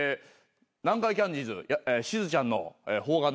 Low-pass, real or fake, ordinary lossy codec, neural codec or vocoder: none; real; none; none